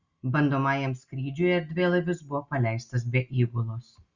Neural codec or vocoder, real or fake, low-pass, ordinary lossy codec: none; real; 7.2 kHz; Opus, 64 kbps